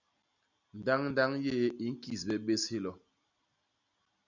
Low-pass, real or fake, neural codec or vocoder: 7.2 kHz; real; none